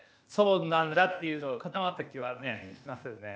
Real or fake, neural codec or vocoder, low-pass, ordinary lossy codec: fake; codec, 16 kHz, 0.8 kbps, ZipCodec; none; none